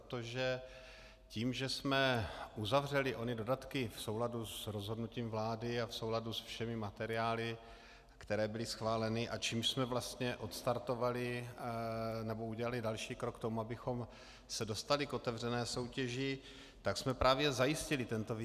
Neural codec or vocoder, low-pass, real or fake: none; 14.4 kHz; real